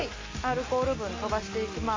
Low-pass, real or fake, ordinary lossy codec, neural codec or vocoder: 7.2 kHz; real; MP3, 32 kbps; none